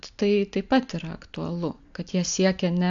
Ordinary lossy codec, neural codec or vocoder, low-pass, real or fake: AAC, 64 kbps; none; 7.2 kHz; real